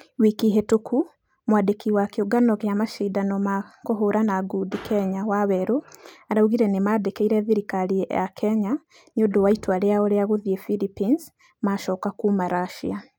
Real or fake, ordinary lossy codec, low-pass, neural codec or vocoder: real; none; 19.8 kHz; none